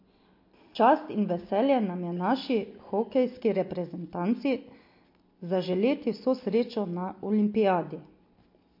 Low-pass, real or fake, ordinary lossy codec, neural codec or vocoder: 5.4 kHz; real; MP3, 32 kbps; none